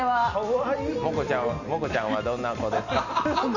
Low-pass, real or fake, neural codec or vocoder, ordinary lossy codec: 7.2 kHz; real; none; none